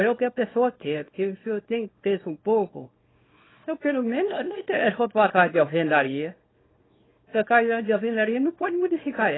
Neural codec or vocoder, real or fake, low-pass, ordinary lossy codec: codec, 24 kHz, 0.9 kbps, WavTokenizer, small release; fake; 7.2 kHz; AAC, 16 kbps